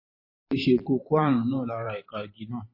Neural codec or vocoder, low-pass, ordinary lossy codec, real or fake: vocoder, 44.1 kHz, 128 mel bands every 512 samples, BigVGAN v2; 5.4 kHz; MP3, 24 kbps; fake